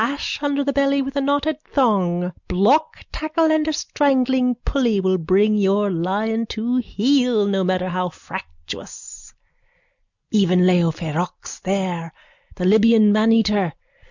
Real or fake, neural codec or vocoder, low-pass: real; none; 7.2 kHz